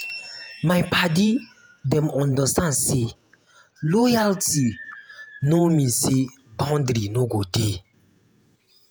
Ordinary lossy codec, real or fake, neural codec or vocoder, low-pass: none; fake; vocoder, 48 kHz, 128 mel bands, Vocos; none